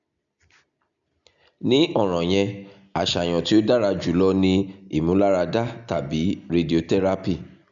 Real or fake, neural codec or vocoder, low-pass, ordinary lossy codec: real; none; 7.2 kHz; none